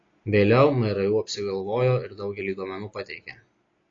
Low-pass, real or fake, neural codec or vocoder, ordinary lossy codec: 7.2 kHz; real; none; AAC, 32 kbps